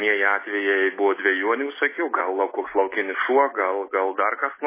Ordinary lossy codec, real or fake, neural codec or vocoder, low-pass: MP3, 16 kbps; real; none; 3.6 kHz